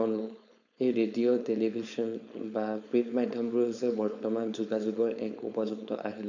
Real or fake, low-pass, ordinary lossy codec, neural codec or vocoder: fake; 7.2 kHz; none; codec, 16 kHz, 4.8 kbps, FACodec